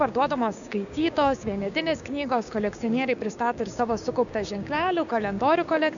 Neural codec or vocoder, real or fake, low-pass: codec, 16 kHz, 6 kbps, DAC; fake; 7.2 kHz